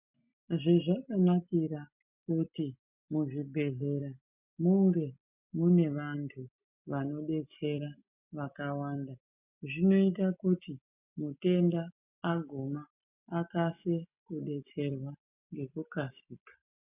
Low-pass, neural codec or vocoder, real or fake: 3.6 kHz; none; real